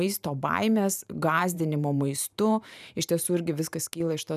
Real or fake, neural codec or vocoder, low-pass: fake; vocoder, 44.1 kHz, 128 mel bands every 512 samples, BigVGAN v2; 14.4 kHz